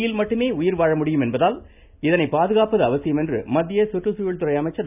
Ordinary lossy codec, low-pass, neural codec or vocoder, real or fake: none; 3.6 kHz; none; real